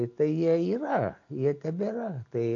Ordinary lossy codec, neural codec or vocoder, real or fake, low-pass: AAC, 48 kbps; none; real; 7.2 kHz